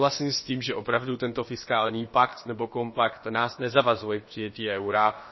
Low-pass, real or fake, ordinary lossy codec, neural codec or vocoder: 7.2 kHz; fake; MP3, 24 kbps; codec, 16 kHz, about 1 kbps, DyCAST, with the encoder's durations